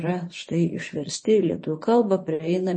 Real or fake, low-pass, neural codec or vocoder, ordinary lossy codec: fake; 9.9 kHz; vocoder, 24 kHz, 100 mel bands, Vocos; MP3, 32 kbps